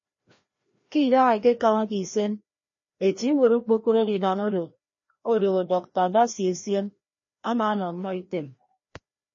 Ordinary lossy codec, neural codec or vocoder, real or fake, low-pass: MP3, 32 kbps; codec, 16 kHz, 1 kbps, FreqCodec, larger model; fake; 7.2 kHz